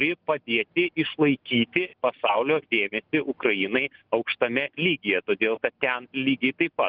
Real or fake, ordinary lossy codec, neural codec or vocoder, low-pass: real; Opus, 32 kbps; none; 5.4 kHz